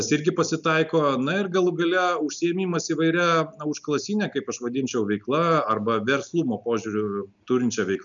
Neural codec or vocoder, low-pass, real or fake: none; 7.2 kHz; real